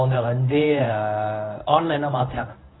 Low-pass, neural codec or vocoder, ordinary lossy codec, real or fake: 7.2 kHz; codec, 24 kHz, 0.9 kbps, WavTokenizer, medium speech release version 1; AAC, 16 kbps; fake